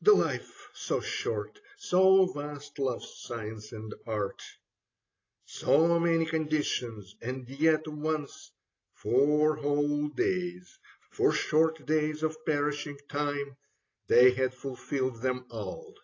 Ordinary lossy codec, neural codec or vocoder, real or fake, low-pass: AAC, 32 kbps; none; real; 7.2 kHz